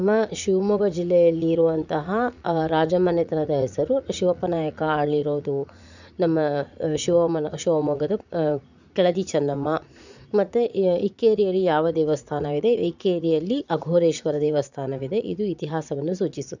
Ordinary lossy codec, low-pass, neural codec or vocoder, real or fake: none; 7.2 kHz; vocoder, 44.1 kHz, 80 mel bands, Vocos; fake